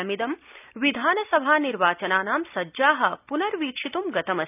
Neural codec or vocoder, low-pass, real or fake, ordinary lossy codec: none; 3.6 kHz; real; none